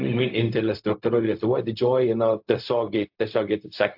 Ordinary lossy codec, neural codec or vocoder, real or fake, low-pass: AAC, 48 kbps; codec, 16 kHz, 0.4 kbps, LongCat-Audio-Codec; fake; 5.4 kHz